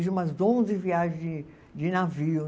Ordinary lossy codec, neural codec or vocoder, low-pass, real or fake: none; none; none; real